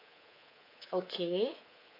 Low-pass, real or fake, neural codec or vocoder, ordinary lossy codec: 5.4 kHz; fake; codec, 24 kHz, 3.1 kbps, DualCodec; none